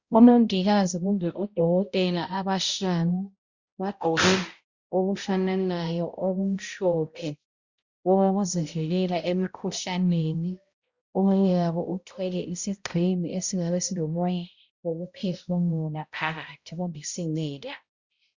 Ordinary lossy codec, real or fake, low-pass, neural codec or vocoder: Opus, 64 kbps; fake; 7.2 kHz; codec, 16 kHz, 0.5 kbps, X-Codec, HuBERT features, trained on balanced general audio